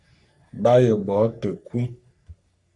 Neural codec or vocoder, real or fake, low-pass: codec, 44.1 kHz, 3.4 kbps, Pupu-Codec; fake; 10.8 kHz